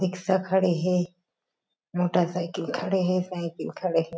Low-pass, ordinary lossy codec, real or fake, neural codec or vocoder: none; none; real; none